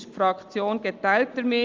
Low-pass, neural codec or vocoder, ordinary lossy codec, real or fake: 7.2 kHz; none; Opus, 24 kbps; real